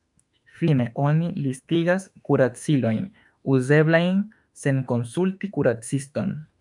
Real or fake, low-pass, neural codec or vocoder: fake; 10.8 kHz; autoencoder, 48 kHz, 32 numbers a frame, DAC-VAE, trained on Japanese speech